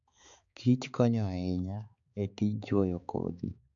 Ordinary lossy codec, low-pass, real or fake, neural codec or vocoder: AAC, 64 kbps; 7.2 kHz; fake; codec, 16 kHz, 4 kbps, X-Codec, HuBERT features, trained on balanced general audio